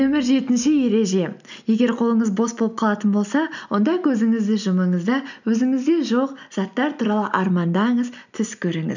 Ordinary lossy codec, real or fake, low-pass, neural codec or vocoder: none; real; 7.2 kHz; none